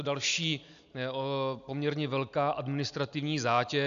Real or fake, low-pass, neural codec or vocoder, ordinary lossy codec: real; 7.2 kHz; none; AAC, 96 kbps